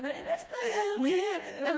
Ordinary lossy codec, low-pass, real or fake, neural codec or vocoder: none; none; fake; codec, 16 kHz, 1 kbps, FreqCodec, smaller model